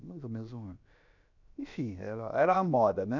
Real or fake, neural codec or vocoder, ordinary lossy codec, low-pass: fake; codec, 16 kHz, about 1 kbps, DyCAST, with the encoder's durations; none; 7.2 kHz